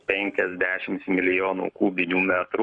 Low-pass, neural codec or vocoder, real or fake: 9.9 kHz; codec, 44.1 kHz, 7.8 kbps, Pupu-Codec; fake